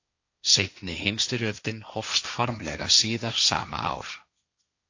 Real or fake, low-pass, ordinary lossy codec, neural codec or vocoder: fake; 7.2 kHz; AAC, 48 kbps; codec, 16 kHz, 1.1 kbps, Voila-Tokenizer